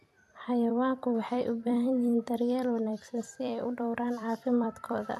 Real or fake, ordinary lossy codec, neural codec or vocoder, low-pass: fake; none; vocoder, 44.1 kHz, 128 mel bands every 256 samples, BigVGAN v2; 14.4 kHz